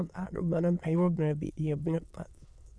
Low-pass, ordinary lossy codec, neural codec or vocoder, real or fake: none; none; autoencoder, 22.05 kHz, a latent of 192 numbers a frame, VITS, trained on many speakers; fake